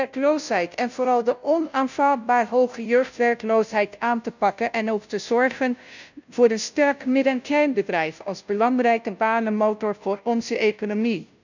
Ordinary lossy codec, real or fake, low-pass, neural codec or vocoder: none; fake; 7.2 kHz; codec, 16 kHz, 0.5 kbps, FunCodec, trained on Chinese and English, 25 frames a second